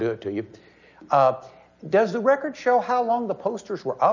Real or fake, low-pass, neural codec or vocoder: real; 7.2 kHz; none